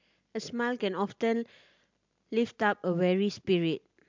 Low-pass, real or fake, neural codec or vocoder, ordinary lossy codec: 7.2 kHz; real; none; MP3, 64 kbps